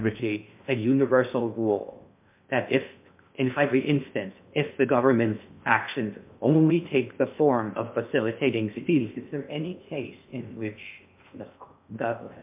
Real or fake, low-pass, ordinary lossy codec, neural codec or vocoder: fake; 3.6 kHz; MP3, 32 kbps; codec, 16 kHz in and 24 kHz out, 0.6 kbps, FocalCodec, streaming, 2048 codes